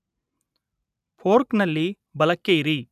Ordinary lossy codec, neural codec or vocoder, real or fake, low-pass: none; none; real; 14.4 kHz